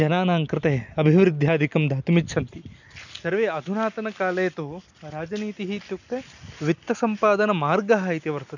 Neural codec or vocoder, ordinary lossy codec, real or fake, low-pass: none; none; real; 7.2 kHz